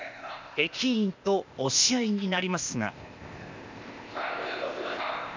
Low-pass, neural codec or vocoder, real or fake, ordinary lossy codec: 7.2 kHz; codec, 16 kHz, 0.8 kbps, ZipCodec; fake; MP3, 64 kbps